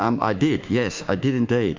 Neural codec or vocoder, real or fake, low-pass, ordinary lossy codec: autoencoder, 48 kHz, 32 numbers a frame, DAC-VAE, trained on Japanese speech; fake; 7.2 kHz; MP3, 48 kbps